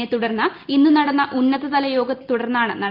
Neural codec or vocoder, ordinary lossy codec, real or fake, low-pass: none; Opus, 24 kbps; real; 5.4 kHz